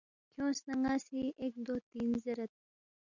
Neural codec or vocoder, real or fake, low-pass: none; real; 7.2 kHz